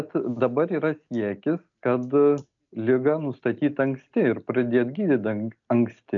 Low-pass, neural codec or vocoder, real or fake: 7.2 kHz; none; real